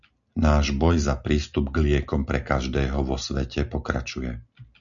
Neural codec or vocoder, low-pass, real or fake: none; 7.2 kHz; real